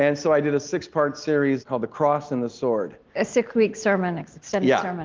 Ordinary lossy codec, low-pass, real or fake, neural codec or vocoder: Opus, 32 kbps; 7.2 kHz; real; none